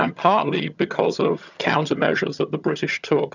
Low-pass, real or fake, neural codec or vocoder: 7.2 kHz; fake; vocoder, 22.05 kHz, 80 mel bands, HiFi-GAN